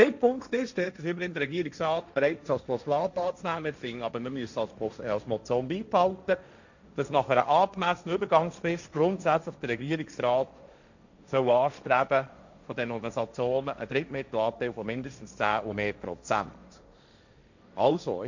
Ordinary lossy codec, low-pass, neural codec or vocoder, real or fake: none; 7.2 kHz; codec, 16 kHz, 1.1 kbps, Voila-Tokenizer; fake